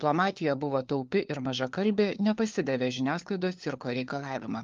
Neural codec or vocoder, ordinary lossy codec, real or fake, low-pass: codec, 16 kHz, 4 kbps, FunCodec, trained on LibriTTS, 50 frames a second; Opus, 32 kbps; fake; 7.2 kHz